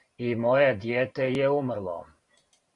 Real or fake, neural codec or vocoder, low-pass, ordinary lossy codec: fake; vocoder, 44.1 kHz, 128 mel bands every 512 samples, BigVGAN v2; 10.8 kHz; AAC, 64 kbps